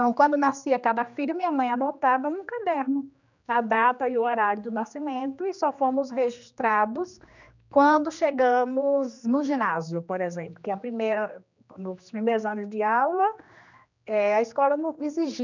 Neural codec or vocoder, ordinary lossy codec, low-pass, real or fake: codec, 16 kHz, 2 kbps, X-Codec, HuBERT features, trained on general audio; none; 7.2 kHz; fake